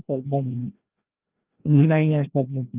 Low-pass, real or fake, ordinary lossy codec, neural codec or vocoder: 3.6 kHz; fake; Opus, 32 kbps; codec, 16 kHz, 1 kbps, FreqCodec, larger model